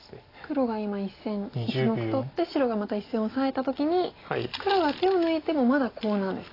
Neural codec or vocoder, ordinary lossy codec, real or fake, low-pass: none; AAC, 24 kbps; real; 5.4 kHz